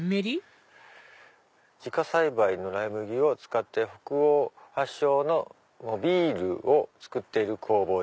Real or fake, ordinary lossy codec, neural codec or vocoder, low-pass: real; none; none; none